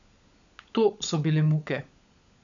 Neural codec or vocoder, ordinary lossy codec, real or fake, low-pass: codec, 16 kHz, 16 kbps, FunCodec, trained on LibriTTS, 50 frames a second; none; fake; 7.2 kHz